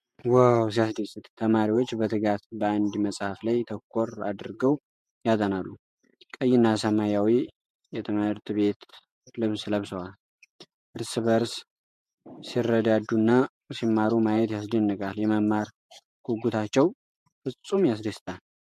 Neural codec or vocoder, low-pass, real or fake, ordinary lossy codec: vocoder, 48 kHz, 128 mel bands, Vocos; 14.4 kHz; fake; MP3, 64 kbps